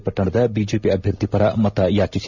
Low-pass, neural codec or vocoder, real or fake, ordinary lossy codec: 7.2 kHz; none; real; none